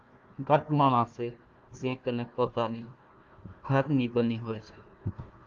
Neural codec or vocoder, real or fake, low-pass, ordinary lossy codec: codec, 16 kHz, 1 kbps, FunCodec, trained on Chinese and English, 50 frames a second; fake; 7.2 kHz; Opus, 32 kbps